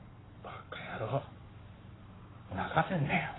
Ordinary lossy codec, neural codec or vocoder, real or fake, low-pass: AAC, 16 kbps; codec, 44.1 kHz, 7.8 kbps, Pupu-Codec; fake; 7.2 kHz